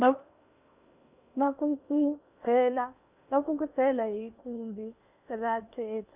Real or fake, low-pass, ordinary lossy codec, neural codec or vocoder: fake; 3.6 kHz; none; codec, 16 kHz in and 24 kHz out, 0.8 kbps, FocalCodec, streaming, 65536 codes